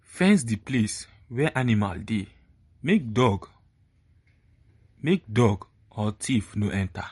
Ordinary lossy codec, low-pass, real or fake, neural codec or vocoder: MP3, 48 kbps; 19.8 kHz; real; none